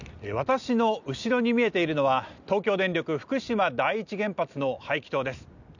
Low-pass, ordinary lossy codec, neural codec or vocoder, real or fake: 7.2 kHz; none; none; real